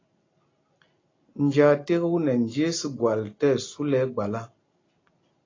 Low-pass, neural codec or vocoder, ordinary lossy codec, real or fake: 7.2 kHz; none; AAC, 32 kbps; real